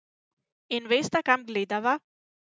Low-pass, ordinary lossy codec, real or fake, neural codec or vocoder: none; none; real; none